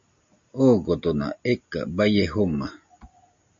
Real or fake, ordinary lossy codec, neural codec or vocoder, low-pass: real; MP3, 48 kbps; none; 7.2 kHz